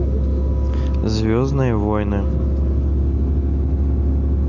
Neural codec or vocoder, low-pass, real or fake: none; 7.2 kHz; real